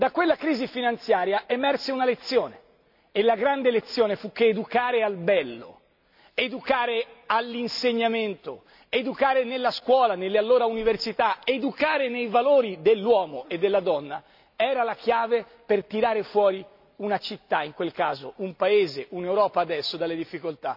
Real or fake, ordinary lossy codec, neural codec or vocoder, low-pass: real; none; none; 5.4 kHz